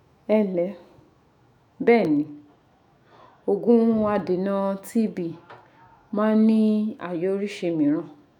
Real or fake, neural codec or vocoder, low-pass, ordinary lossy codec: fake; autoencoder, 48 kHz, 128 numbers a frame, DAC-VAE, trained on Japanese speech; 19.8 kHz; none